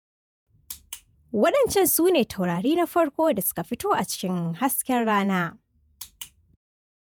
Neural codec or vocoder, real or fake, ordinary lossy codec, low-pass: vocoder, 48 kHz, 128 mel bands, Vocos; fake; none; none